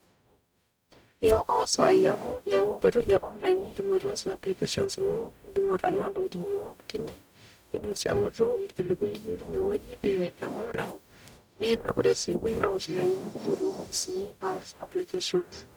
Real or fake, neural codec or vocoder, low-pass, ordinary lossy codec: fake; codec, 44.1 kHz, 0.9 kbps, DAC; none; none